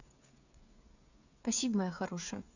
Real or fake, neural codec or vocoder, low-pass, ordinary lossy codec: fake; codec, 16 kHz, 8 kbps, FreqCodec, smaller model; 7.2 kHz; none